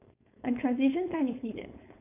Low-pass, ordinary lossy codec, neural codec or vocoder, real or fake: 3.6 kHz; none; codec, 16 kHz, 4.8 kbps, FACodec; fake